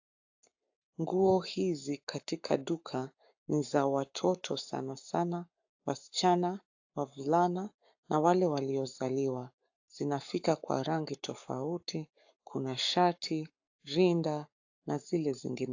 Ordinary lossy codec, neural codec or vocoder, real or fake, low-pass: AAC, 48 kbps; codec, 44.1 kHz, 7.8 kbps, Pupu-Codec; fake; 7.2 kHz